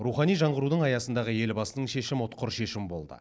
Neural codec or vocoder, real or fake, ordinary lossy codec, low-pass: none; real; none; none